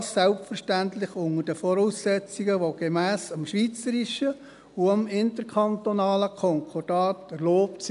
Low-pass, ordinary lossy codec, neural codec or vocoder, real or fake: 10.8 kHz; none; none; real